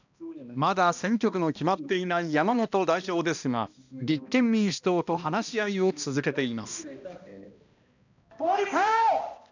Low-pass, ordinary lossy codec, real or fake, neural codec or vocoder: 7.2 kHz; none; fake; codec, 16 kHz, 1 kbps, X-Codec, HuBERT features, trained on balanced general audio